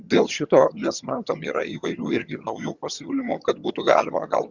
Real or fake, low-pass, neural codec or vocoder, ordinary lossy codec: fake; 7.2 kHz; vocoder, 22.05 kHz, 80 mel bands, HiFi-GAN; Opus, 64 kbps